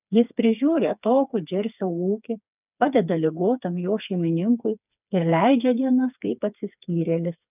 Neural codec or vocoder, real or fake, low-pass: codec, 16 kHz, 4 kbps, FreqCodec, smaller model; fake; 3.6 kHz